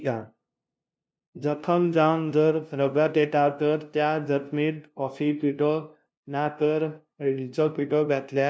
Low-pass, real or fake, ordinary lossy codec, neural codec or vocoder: none; fake; none; codec, 16 kHz, 0.5 kbps, FunCodec, trained on LibriTTS, 25 frames a second